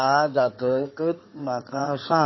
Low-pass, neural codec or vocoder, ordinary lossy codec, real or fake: 7.2 kHz; codec, 16 kHz in and 24 kHz out, 2.2 kbps, FireRedTTS-2 codec; MP3, 24 kbps; fake